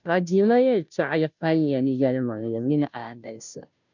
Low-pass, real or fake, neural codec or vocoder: 7.2 kHz; fake; codec, 16 kHz, 0.5 kbps, FunCodec, trained on Chinese and English, 25 frames a second